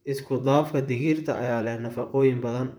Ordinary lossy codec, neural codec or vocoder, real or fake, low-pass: none; vocoder, 44.1 kHz, 128 mel bands, Pupu-Vocoder; fake; none